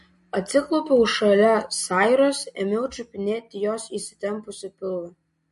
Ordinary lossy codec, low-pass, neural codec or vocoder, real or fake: MP3, 48 kbps; 14.4 kHz; none; real